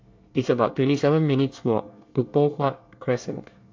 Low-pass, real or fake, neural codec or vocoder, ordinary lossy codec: 7.2 kHz; fake; codec, 24 kHz, 1 kbps, SNAC; AAC, 48 kbps